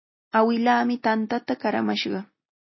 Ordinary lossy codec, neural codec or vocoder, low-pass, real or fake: MP3, 24 kbps; none; 7.2 kHz; real